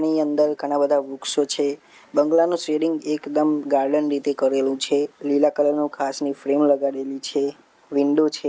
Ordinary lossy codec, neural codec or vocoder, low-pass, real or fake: none; none; none; real